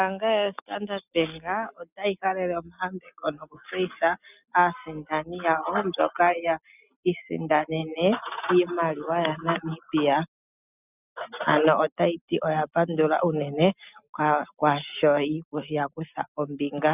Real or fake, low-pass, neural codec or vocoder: real; 3.6 kHz; none